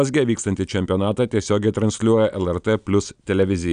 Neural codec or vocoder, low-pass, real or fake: none; 9.9 kHz; real